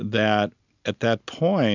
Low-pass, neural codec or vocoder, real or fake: 7.2 kHz; none; real